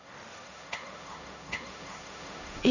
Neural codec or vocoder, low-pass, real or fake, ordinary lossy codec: codec, 16 kHz, 1.1 kbps, Voila-Tokenizer; 7.2 kHz; fake; none